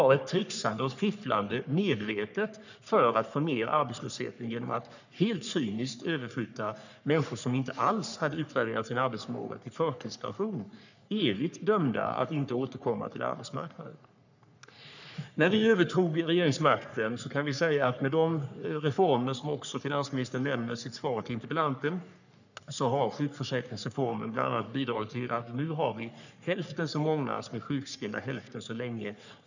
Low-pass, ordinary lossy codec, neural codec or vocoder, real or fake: 7.2 kHz; none; codec, 44.1 kHz, 3.4 kbps, Pupu-Codec; fake